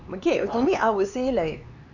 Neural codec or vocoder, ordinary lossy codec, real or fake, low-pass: codec, 16 kHz, 4 kbps, X-Codec, HuBERT features, trained on LibriSpeech; none; fake; 7.2 kHz